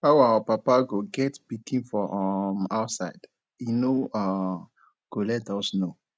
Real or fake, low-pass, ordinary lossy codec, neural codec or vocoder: real; none; none; none